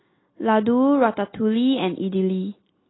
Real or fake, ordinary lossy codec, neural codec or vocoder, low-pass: real; AAC, 16 kbps; none; 7.2 kHz